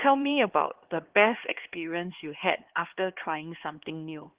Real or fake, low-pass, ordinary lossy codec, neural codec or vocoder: fake; 3.6 kHz; Opus, 16 kbps; codec, 16 kHz, 2 kbps, X-Codec, HuBERT features, trained on LibriSpeech